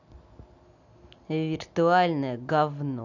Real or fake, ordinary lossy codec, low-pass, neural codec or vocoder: real; none; 7.2 kHz; none